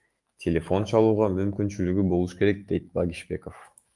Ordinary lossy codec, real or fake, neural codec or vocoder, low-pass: Opus, 32 kbps; fake; autoencoder, 48 kHz, 128 numbers a frame, DAC-VAE, trained on Japanese speech; 10.8 kHz